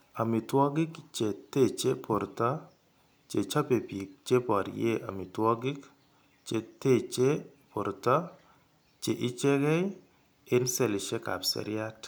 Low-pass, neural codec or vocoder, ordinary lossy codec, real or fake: none; none; none; real